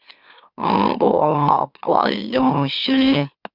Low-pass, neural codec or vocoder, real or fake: 5.4 kHz; autoencoder, 44.1 kHz, a latent of 192 numbers a frame, MeloTTS; fake